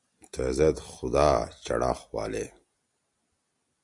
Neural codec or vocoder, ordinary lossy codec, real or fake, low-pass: none; MP3, 96 kbps; real; 10.8 kHz